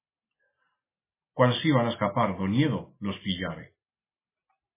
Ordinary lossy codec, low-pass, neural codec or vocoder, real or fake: MP3, 16 kbps; 3.6 kHz; none; real